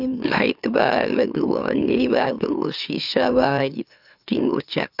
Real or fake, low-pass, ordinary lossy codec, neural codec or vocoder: fake; 5.4 kHz; AAC, 48 kbps; autoencoder, 44.1 kHz, a latent of 192 numbers a frame, MeloTTS